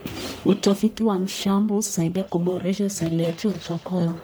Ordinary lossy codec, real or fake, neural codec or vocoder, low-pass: none; fake; codec, 44.1 kHz, 1.7 kbps, Pupu-Codec; none